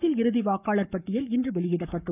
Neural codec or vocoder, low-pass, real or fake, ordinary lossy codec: codec, 44.1 kHz, 7.8 kbps, Pupu-Codec; 3.6 kHz; fake; none